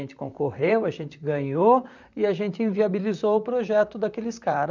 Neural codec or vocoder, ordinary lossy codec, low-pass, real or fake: vocoder, 44.1 kHz, 128 mel bands, Pupu-Vocoder; none; 7.2 kHz; fake